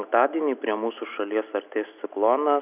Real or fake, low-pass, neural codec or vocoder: real; 3.6 kHz; none